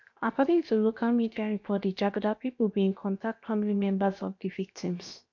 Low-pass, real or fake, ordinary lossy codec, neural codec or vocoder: 7.2 kHz; fake; none; codec, 16 kHz, 0.7 kbps, FocalCodec